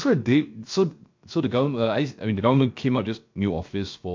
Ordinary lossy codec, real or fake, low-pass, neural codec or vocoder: MP3, 48 kbps; fake; 7.2 kHz; codec, 16 kHz, 0.7 kbps, FocalCodec